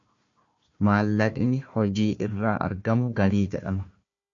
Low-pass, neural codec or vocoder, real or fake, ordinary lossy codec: 7.2 kHz; codec, 16 kHz, 1 kbps, FunCodec, trained on Chinese and English, 50 frames a second; fake; AAC, 64 kbps